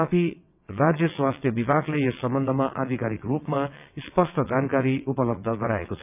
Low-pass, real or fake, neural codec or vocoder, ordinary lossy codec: 3.6 kHz; fake; vocoder, 22.05 kHz, 80 mel bands, WaveNeXt; none